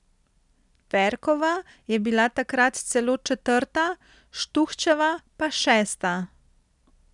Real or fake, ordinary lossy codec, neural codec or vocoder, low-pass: real; none; none; 10.8 kHz